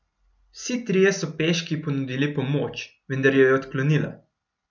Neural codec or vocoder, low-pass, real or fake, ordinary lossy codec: none; 7.2 kHz; real; none